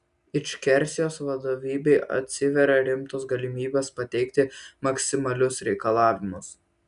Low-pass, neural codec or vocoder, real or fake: 10.8 kHz; none; real